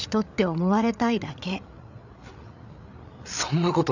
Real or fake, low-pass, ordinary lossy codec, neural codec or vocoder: fake; 7.2 kHz; none; codec, 16 kHz, 8 kbps, FreqCodec, larger model